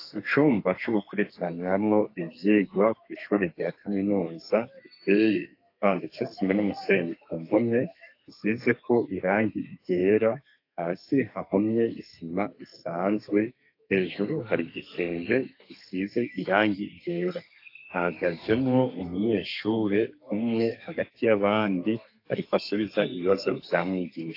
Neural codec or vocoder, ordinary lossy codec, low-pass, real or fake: codec, 32 kHz, 1.9 kbps, SNAC; AAC, 32 kbps; 5.4 kHz; fake